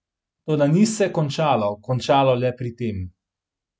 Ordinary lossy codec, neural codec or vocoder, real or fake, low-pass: none; none; real; none